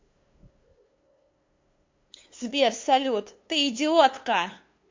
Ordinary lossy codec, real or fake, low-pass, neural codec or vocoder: MP3, 48 kbps; fake; 7.2 kHz; codec, 16 kHz, 2 kbps, FunCodec, trained on LibriTTS, 25 frames a second